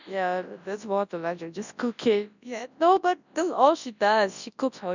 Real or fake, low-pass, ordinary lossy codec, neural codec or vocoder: fake; 7.2 kHz; none; codec, 24 kHz, 0.9 kbps, WavTokenizer, large speech release